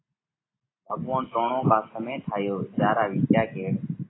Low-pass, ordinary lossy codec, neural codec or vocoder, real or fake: 7.2 kHz; AAC, 16 kbps; none; real